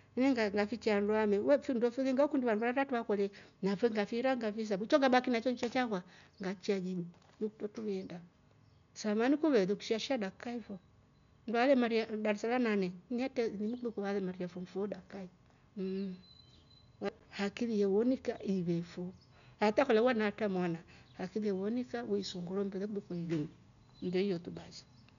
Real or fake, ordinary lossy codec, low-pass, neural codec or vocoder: real; none; 7.2 kHz; none